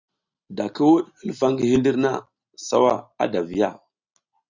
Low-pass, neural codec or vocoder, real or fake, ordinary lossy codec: 7.2 kHz; none; real; Opus, 64 kbps